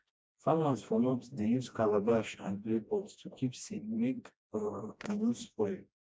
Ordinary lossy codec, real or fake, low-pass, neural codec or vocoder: none; fake; none; codec, 16 kHz, 1 kbps, FreqCodec, smaller model